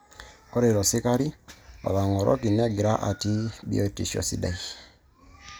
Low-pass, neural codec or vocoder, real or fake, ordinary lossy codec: none; none; real; none